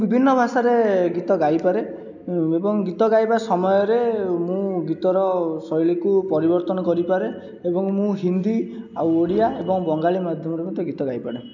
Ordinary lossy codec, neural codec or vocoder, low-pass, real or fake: none; none; 7.2 kHz; real